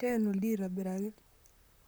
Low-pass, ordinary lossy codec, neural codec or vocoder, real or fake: none; none; vocoder, 44.1 kHz, 128 mel bands, Pupu-Vocoder; fake